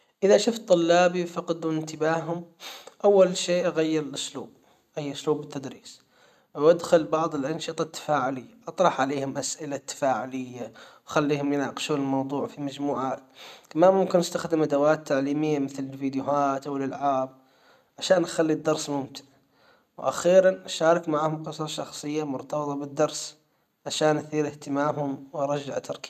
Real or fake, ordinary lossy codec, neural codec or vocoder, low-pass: real; none; none; 14.4 kHz